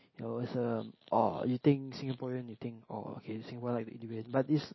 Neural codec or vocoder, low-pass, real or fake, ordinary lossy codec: none; 7.2 kHz; real; MP3, 24 kbps